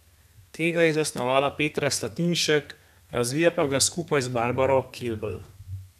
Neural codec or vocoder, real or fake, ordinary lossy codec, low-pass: codec, 32 kHz, 1.9 kbps, SNAC; fake; none; 14.4 kHz